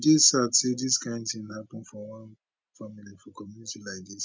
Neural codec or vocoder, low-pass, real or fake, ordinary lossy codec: none; none; real; none